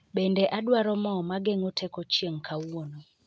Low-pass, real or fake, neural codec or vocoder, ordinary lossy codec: none; real; none; none